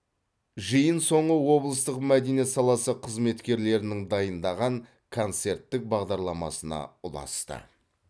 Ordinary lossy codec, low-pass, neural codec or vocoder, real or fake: none; none; none; real